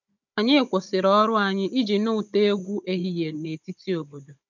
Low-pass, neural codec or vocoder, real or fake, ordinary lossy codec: 7.2 kHz; codec, 16 kHz, 16 kbps, FunCodec, trained on Chinese and English, 50 frames a second; fake; none